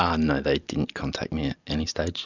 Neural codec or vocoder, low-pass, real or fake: none; 7.2 kHz; real